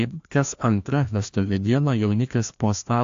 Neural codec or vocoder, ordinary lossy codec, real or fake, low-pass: codec, 16 kHz, 1 kbps, FreqCodec, larger model; AAC, 64 kbps; fake; 7.2 kHz